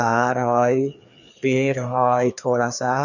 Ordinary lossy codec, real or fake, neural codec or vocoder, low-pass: none; fake; codec, 16 kHz, 2 kbps, FreqCodec, larger model; 7.2 kHz